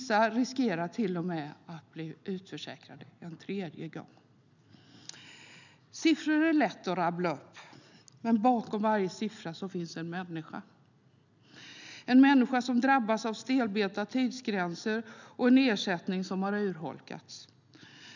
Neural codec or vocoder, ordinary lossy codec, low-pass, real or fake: none; none; 7.2 kHz; real